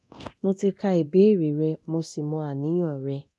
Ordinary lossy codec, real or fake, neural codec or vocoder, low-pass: none; fake; codec, 24 kHz, 0.9 kbps, DualCodec; none